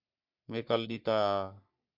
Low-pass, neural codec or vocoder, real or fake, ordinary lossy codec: 5.4 kHz; codec, 44.1 kHz, 3.4 kbps, Pupu-Codec; fake; MP3, 48 kbps